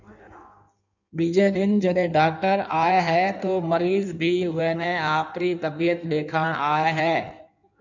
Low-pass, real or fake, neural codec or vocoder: 7.2 kHz; fake; codec, 16 kHz in and 24 kHz out, 1.1 kbps, FireRedTTS-2 codec